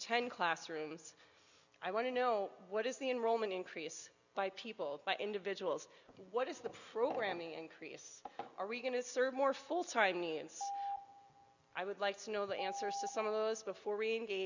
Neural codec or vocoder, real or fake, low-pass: none; real; 7.2 kHz